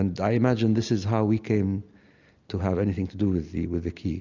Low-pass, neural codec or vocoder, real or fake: 7.2 kHz; none; real